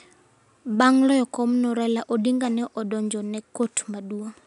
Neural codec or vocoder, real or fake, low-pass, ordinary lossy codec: none; real; 10.8 kHz; none